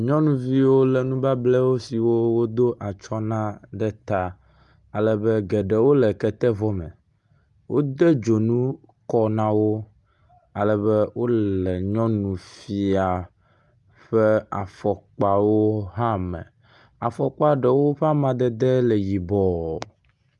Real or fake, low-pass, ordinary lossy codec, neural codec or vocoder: real; 10.8 kHz; Opus, 32 kbps; none